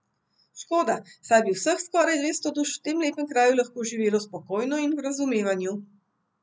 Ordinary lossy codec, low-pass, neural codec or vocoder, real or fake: none; none; none; real